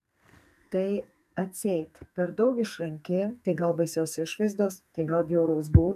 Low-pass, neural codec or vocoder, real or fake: 14.4 kHz; codec, 44.1 kHz, 2.6 kbps, SNAC; fake